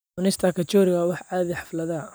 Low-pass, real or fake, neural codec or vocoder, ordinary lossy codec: none; real; none; none